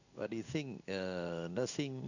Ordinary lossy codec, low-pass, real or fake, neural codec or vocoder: none; 7.2 kHz; fake; codec, 16 kHz in and 24 kHz out, 1 kbps, XY-Tokenizer